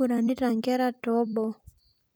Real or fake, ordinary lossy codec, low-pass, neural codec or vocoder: fake; none; none; vocoder, 44.1 kHz, 128 mel bands every 256 samples, BigVGAN v2